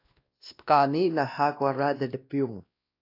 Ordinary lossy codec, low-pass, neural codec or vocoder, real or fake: AAC, 32 kbps; 5.4 kHz; codec, 16 kHz, 1 kbps, X-Codec, WavLM features, trained on Multilingual LibriSpeech; fake